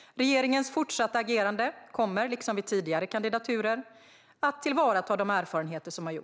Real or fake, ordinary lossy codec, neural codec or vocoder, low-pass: real; none; none; none